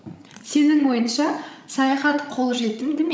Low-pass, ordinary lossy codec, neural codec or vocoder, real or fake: none; none; codec, 16 kHz, 16 kbps, FreqCodec, larger model; fake